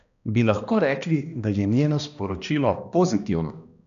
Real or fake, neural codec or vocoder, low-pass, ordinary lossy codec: fake; codec, 16 kHz, 1 kbps, X-Codec, HuBERT features, trained on balanced general audio; 7.2 kHz; none